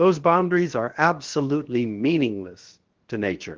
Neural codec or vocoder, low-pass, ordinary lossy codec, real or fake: codec, 16 kHz, about 1 kbps, DyCAST, with the encoder's durations; 7.2 kHz; Opus, 16 kbps; fake